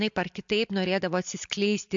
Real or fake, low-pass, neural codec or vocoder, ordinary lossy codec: real; 7.2 kHz; none; MP3, 64 kbps